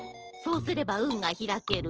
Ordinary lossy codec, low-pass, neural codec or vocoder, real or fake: Opus, 16 kbps; 7.2 kHz; none; real